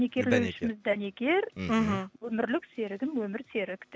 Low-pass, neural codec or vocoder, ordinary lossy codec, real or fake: none; none; none; real